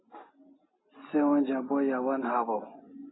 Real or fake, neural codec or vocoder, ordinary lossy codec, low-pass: real; none; AAC, 16 kbps; 7.2 kHz